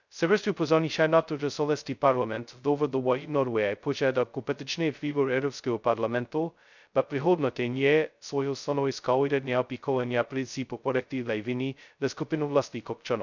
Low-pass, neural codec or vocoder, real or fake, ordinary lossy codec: 7.2 kHz; codec, 16 kHz, 0.2 kbps, FocalCodec; fake; none